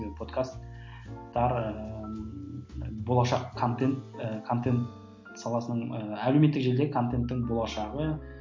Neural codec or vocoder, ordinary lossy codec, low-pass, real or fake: none; MP3, 48 kbps; 7.2 kHz; real